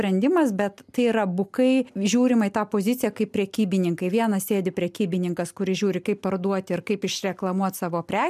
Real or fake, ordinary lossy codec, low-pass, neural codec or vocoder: real; MP3, 96 kbps; 14.4 kHz; none